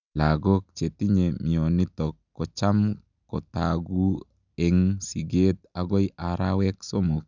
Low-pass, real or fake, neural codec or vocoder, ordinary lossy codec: 7.2 kHz; real; none; none